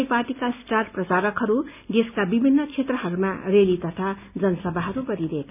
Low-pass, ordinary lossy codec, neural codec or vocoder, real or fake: 3.6 kHz; none; none; real